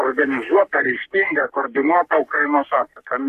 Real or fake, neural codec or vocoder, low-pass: fake; codec, 44.1 kHz, 3.4 kbps, Pupu-Codec; 14.4 kHz